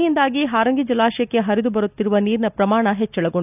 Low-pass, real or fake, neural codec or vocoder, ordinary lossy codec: 3.6 kHz; real; none; none